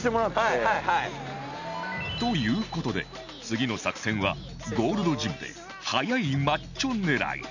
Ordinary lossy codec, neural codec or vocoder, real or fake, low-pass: none; none; real; 7.2 kHz